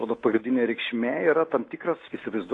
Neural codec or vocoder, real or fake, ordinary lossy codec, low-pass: none; real; AAC, 32 kbps; 10.8 kHz